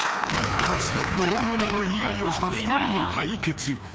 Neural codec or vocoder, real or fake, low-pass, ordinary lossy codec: codec, 16 kHz, 2 kbps, FreqCodec, larger model; fake; none; none